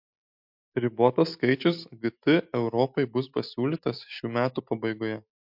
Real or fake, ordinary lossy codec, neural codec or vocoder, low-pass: real; MP3, 48 kbps; none; 5.4 kHz